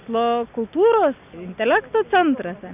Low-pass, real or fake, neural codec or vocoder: 3.6 kHz; real; none